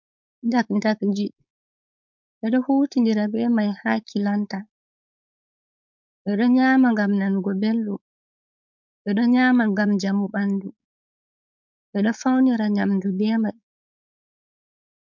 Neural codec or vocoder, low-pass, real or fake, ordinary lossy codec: codec, 16 kHz, 4.8 kbps, FACodec; 7.2 kHz; fake; MP3, 64 kbps